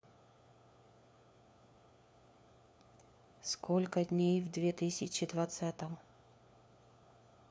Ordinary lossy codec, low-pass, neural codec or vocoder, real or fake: none; none; codec, 16 kHz, 4 kbps, FunCodec, trained on LibriTTS, 50 frames a second; fake